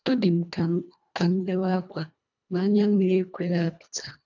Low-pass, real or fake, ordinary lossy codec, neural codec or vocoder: 7.2 kHz; fake; none; codec, 24 kHz, 1.5 kbps, HILCodec